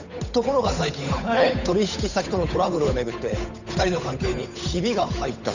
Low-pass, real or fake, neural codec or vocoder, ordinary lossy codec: 7.2 kHz; fake; codec, 16 kHz, 8 kbps, FunCodec, trained on Chinese and English, 25 frames a second; none